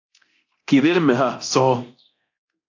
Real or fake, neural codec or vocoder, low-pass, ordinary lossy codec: fake; codec, 24 kHz, 0.9 kbps, DualCodec; 7.2 kHz; AAC, 48 kbps